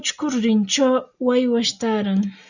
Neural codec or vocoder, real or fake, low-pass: none; real; 7.2 kHz